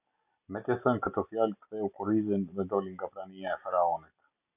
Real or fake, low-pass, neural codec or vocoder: real; 3.6 kHz; none